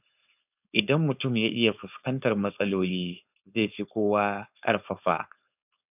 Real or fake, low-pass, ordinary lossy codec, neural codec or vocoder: fake; 3.6 kHz; none; codec, 16 kHz, 4.8 kbps, FACodec